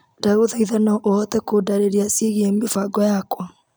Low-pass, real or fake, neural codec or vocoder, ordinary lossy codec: none; real; none; none